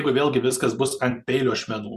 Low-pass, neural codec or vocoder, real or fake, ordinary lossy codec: 14.4 kHz; none; real; Opus, 64 kbps